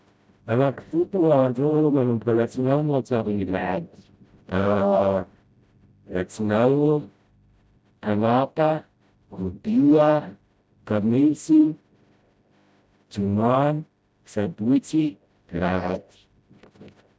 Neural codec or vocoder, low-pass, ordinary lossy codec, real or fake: codec, 16 kHz, 0.5 kbps, FreqCodec, smaller model; none; none; fake